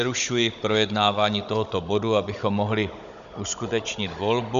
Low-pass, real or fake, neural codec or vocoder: 7.2 kHz; fake; codec, 16 kHz, 16 kbps, FunCodec, trained on Chinese and English, 50 frames a second